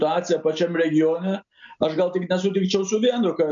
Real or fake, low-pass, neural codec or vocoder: real; 7.2 kHz; none